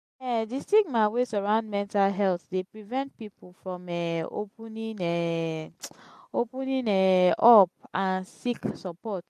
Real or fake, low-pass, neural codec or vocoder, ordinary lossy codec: real; 14.4 kHz; none; MP3, 64 kbps